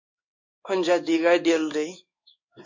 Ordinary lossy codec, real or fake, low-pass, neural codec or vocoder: MP3, 48 kbps; fake; 7.2 kHz; codec, 16 kHz in and 24 kHz out, 1 kbps, XY-Tokenizer